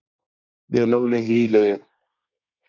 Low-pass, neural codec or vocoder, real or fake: 7.2 kHz; codec, 24 kHz, 1 kbps, SNAC; fake